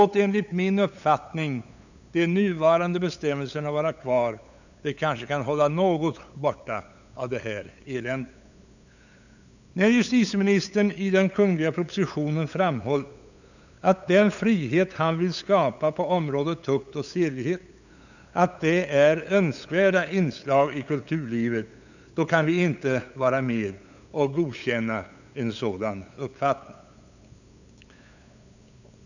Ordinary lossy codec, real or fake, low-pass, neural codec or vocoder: none; fake; 7.2 kHz; codec, 16 kHz, 8 kbps, FunCodec, trained on LibriTTS, 25 frames a second